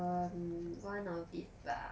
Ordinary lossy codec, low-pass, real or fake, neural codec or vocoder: none; none; real; none